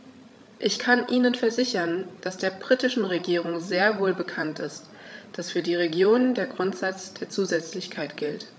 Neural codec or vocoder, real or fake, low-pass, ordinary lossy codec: codec, 16 kHz, 16 kbps, FreqCodec, larger model; fake; none; none